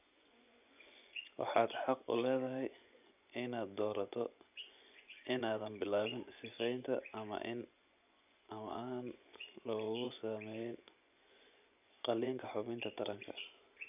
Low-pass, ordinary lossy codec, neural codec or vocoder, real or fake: 3.6 kHz; none; vocoder, 24 kHz, 100 mel bands, Vocos; fake